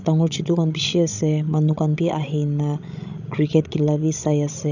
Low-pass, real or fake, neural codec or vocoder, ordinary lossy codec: 7.2 kHz; fake; codec, 16 kHz, 16 kbps, FreqCodec, larger model; none